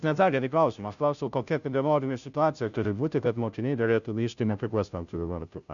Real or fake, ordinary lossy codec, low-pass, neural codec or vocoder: fake; MP3, 96 kbps; 7.2 kHz; codec, 16 kHz, 0.5 kbps, FunCodec, trained on Chinese and English, 25 frames a second